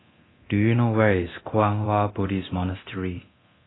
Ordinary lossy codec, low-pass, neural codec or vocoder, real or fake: AAC, 16 kbps; 7.2 kHz; codec, 24 kHz, 0.9 kbps, DualCodec; fake